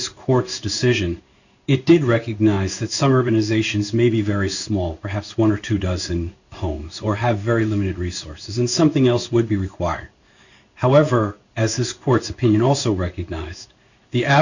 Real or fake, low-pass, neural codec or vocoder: fake; 7.2 kHz; codec, 16 kHz in and 24 kHz out, 1 kbps, XY-Tokenizer